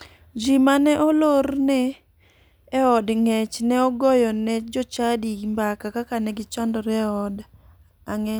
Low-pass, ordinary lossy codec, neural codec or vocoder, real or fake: none; none; none; real